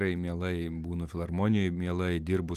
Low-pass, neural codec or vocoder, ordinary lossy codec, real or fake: 14.4 kHz; none; Opus, 24 kbps; real